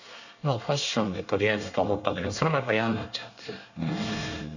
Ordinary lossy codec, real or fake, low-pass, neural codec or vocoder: none; fake; 7.2 kHz; codec, 24 kHz, 1 kbps, SNAC